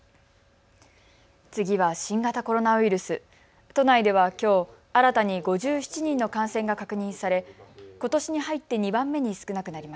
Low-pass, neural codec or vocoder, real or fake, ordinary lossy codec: none; none; real; none